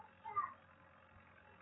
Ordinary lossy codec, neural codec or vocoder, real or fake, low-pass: AAC, 16 kbps; none; real; 7.2 kHz